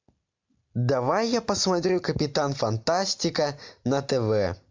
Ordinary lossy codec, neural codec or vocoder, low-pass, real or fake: MP3, 64 kbps; none; 7.2 kHz; real